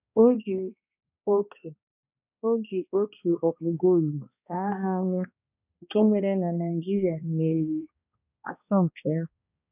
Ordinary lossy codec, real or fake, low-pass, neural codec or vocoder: none; fake; 3.6 kHz; codec, 16 kHz, 1 kbps, X-Codec, HuBERT features, trained on balanced general audio